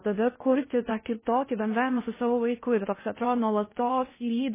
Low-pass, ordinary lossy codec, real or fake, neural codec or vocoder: 3.6 kHz; MP3, 16 kbps; fake; codec, 16 kHz in and 24 kHz out, 0.6 kbps, FocalCodec, streaming, 2048 codes